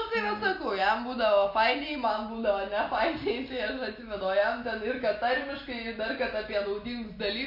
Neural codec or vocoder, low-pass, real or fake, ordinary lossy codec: none; 5.4 kHz; real; MP3, 32 kbps